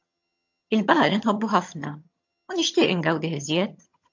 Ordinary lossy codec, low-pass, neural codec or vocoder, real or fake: MP3, 48 kbps; 7.2 kHz; vocoder, 22.05 kHz, 80 mel bands, HiFi-GAN; fake